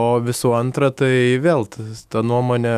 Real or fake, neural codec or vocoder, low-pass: fake; autoencoder, 48 kHz, 128 numbers a frame, DAC-VAE, trained on Japanese speech; 14.4 kHz